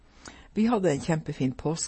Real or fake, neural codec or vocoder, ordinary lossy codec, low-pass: fake; vocoder, 24 kHz, 100 mel bands, Vocos; MP3, 32 kbps; 10.8 kHz